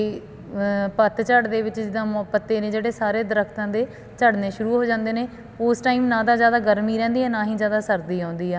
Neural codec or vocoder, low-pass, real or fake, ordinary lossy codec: none; none; real; none